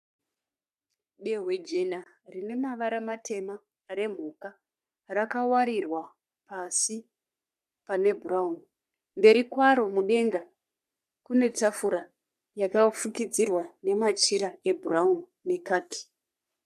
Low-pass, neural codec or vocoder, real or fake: 14.4 kHz; codec, 44.1 kHz, 3.4 kbps, Pupu-Codec; fake